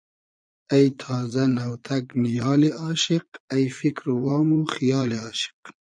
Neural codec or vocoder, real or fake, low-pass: vocoder, 22.05 kHz, 80 mel bands, Vocos; fake; 9.9 kHz